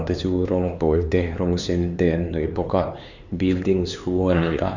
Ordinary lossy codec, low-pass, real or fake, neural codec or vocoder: none; 7.2 kHz; fake; codec, 16 kHz, 4 kbps, X-Codec, HuBERT features, trained on LibriSpeech